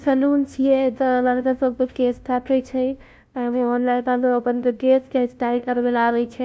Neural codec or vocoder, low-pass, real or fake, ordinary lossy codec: codec, 16 kHz, 0.5 kbps, FunCodec, trained on LibriTTS, 25 frames a second; none; fake; none